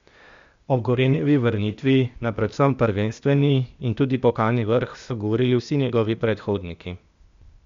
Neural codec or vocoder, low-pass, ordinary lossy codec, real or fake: codec, 16 kHz, 0.8 kbps, ZipCodec; 7.2 kHz; MP3, 64 kbps; fake